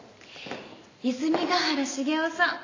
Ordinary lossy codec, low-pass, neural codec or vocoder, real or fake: AAC, 32 kbps; 7.2 kHz; none; real